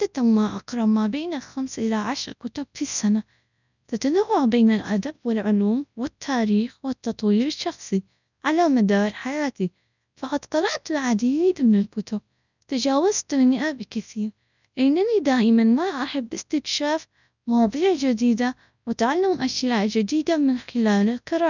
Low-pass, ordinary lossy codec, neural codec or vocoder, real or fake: 7.2 kHz; none; codec, 24 kHz, 0.9 kbps, WavTokenizer, large speech release; fake